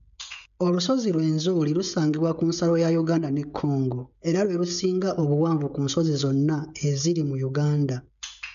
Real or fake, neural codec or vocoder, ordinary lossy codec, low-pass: fake; codec, 16 kHz, 16 kbps, FreqCodec, smaller model; none; 7.2 kHz